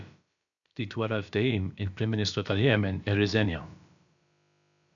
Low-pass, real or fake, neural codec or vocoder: 7.2 kHz; fake; codec, 16 kHz, about 1 kbps, DyCAST, with the encoder's durations